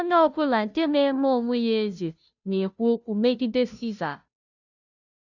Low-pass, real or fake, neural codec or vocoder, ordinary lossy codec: 7.2 kHz; fake; codec, 16 kHz, 0.5 kbps, FunCodec, trained on Chinese and English, 25 frames a second; none